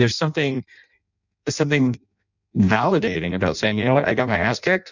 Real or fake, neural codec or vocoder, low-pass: fake; codec, 16 kHz in and 24 kHz out, 0.6 kbps, FireRedTTS-2 codec; 7.2 kHz